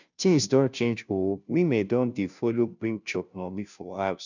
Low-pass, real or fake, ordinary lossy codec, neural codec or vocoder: 7.2 kHz; fake; none; codec, 16 kHz, 0.5 kbps, FunCodec, trained on Chinese and English, 25 frames a second